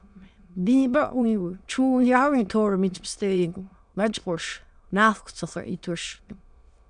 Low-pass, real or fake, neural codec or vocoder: 9.9 kHz; fake; autoencoder, 22.05 kHz, a latent of 192 numbers a frame, VITS, trained on many speakers